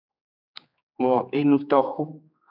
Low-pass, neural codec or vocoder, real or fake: 5.4 kHz; codec, 16 kHz, 4 kbps, X-Codec, HuBERT features, trained on general audio; fake